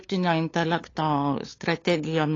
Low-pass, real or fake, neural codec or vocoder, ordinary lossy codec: 7.2 kHz; fake; codec, 16 kHz, 8 kbps, FunCodec, trained on LibriTTS, 25 frames a second; AAC, 32 kbps